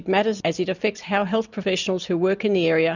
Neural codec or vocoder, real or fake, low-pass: none; real; 7.2 kHz